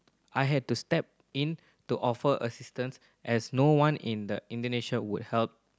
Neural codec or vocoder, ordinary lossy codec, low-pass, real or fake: none; none; none; real